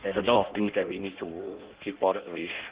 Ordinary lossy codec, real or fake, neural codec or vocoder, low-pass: Opus, 16 kbps; fake; codec, 16 kHz in and 24 kHz out, 0.6 kbps, FireRedTTS-2 codec; 3.6 kHz